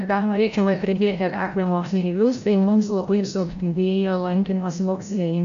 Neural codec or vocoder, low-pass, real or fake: codec, 16 kHz, 0.5 kbps, FreqCodec, larger model; 7.2 kHz; fake